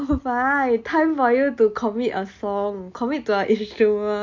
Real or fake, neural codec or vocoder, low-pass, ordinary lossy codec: real; none; 7.2 kHz; MP3, 48 kbps